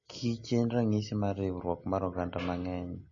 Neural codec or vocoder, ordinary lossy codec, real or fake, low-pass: none; MP3, 32 kbps; real; 7.2 kHz